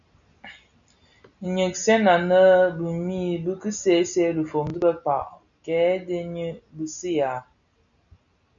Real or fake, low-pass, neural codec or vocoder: real; 7.2 kHz; none